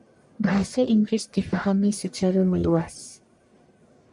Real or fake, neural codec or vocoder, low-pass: fake; codec, 44.1 kHz, 1.7 kbps, Pupu-Codec; 10.8 kHz